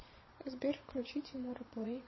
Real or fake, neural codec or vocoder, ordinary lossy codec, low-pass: fake; vocoder, 22.05 kHz, 80 mel bands, Vocos; MP3, 24 kbps; 7.2 kHz